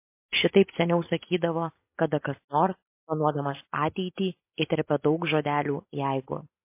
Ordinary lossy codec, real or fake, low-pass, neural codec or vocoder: MP3, 24 kbps; real; 3.6 kHz; none